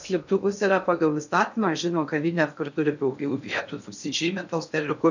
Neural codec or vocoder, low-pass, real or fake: codec, 16 kHz in and 24 kHz out, 0.6 kbps, FocalCodec, streaming, 2048 codes; 7.2 kHz; fake